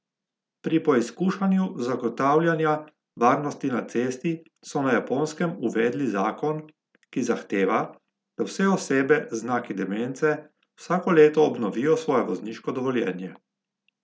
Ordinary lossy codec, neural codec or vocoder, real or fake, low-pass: none; none; real; none